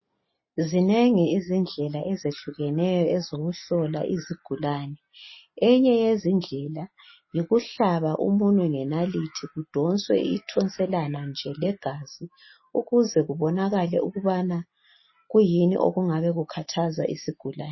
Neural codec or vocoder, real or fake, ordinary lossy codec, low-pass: none; real; MP3, 24 kbps; 7.2 kHz